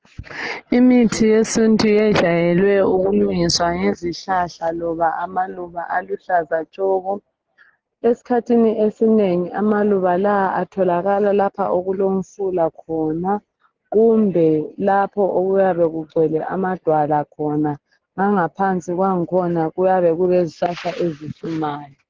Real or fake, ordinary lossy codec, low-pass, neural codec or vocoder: real; Opus, 16 kbps; 7.2 kHz; none